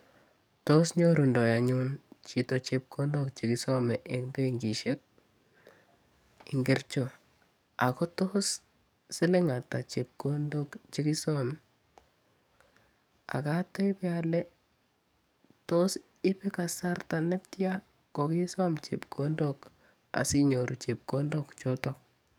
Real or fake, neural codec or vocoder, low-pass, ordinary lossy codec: fake; codec, 44.1 kHz, 7.8 kbps, DAC; none; none